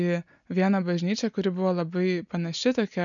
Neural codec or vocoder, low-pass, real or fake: none; 7.2 kHz; real